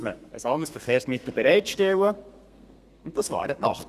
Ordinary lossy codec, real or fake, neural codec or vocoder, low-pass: Opus, 64 kbps; fake; codec, 32 kHz, 1.9 kbps, SNAC; 14.4 kHz